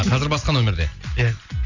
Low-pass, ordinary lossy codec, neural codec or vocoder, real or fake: 7.2 kHz; none; none; real